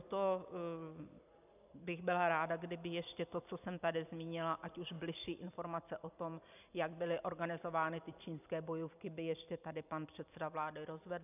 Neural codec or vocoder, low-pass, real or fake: vocoder, 44.1 kHz, 128 mel bands every 256 samples, BigVGAN v2; 3.6 kHz; fake